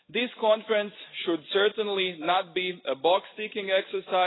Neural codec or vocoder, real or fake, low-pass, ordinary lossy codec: none; real; 7.2 kHz; AAC, 16 kbps